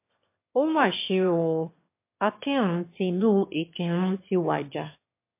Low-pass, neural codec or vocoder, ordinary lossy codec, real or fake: 3.6 kHz; autoencoder, 22.05 kHz, a latent of 192 numbers a frame, VITS, trained on one speaker; MP3, 24 kbps; fake